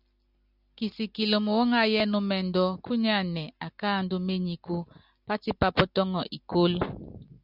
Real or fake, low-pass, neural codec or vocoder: real; 5.4 kHz; none